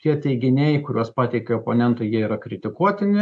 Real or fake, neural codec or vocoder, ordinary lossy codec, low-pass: real; none; AAC, 64 kbps; 9.9 kHz